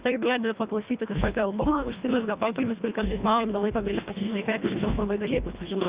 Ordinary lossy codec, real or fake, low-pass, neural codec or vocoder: Opus, 64 kbps; fake; 3.6 kHz; codec, 24 kHz, 1.5 kbps, HILCodec